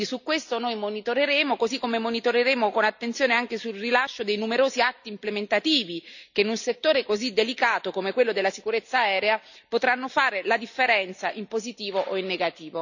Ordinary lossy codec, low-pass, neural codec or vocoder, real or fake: none; 7.2 kHz; none; real